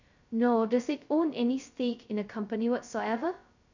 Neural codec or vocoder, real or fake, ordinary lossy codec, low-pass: codec, 16 kHz, 0.2 kbps, FocalCodec; fake; none; 7.2 kHz